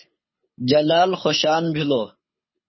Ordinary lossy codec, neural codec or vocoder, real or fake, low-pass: MP3, 24 kbps; codec, 24 kHz, 6 kbps, HILCodec; fake; 7.2 kHz